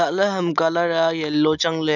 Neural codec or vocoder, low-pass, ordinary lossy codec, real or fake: none; 7.2 kHz; none; real